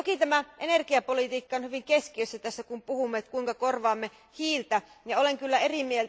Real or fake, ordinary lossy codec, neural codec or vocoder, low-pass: real; none; none; none